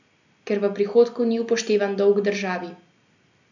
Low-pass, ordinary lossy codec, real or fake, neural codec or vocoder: 7.2 kHz; none; real; none